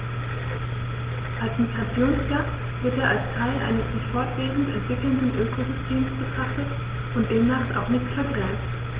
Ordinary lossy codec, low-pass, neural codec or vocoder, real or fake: Opus, 16 kbps; 3.6 kHz; none; real